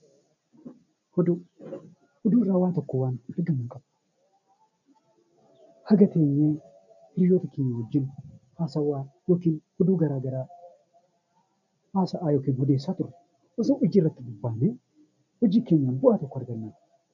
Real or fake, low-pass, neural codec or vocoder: real; 7.2 kHz; none